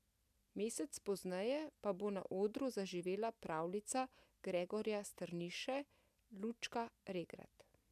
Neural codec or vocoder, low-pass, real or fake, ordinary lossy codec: none; 14.4 kHz; real; none